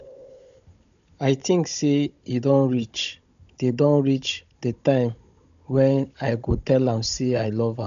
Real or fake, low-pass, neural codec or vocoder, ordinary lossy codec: fake; 7.2 kHz; codec, 16 kHz, 16 kbps, FunCodec, trained on Chinese and English, 50 frames a second; none